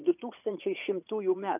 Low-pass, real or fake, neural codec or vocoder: 3.6 kHz; fake; vocoder, 44.1 kHz, 80 mel bands, Vocos